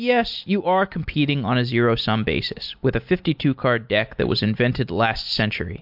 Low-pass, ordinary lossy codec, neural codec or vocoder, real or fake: 5.4 kHz; MP3, 48 kbps; none; real